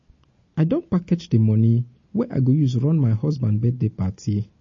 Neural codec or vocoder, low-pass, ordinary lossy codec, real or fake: none; 7.2 kHz; MP3, 32 kbps; real